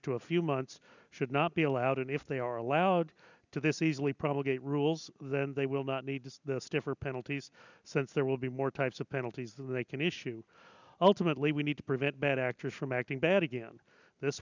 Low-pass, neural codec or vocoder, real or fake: 7.2 kHz; none; real